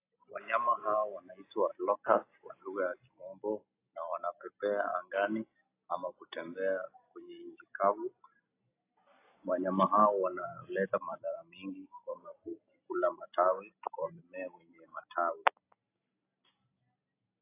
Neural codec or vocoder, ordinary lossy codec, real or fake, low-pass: none; AAC, 24 kbps; real; 3.6 kHz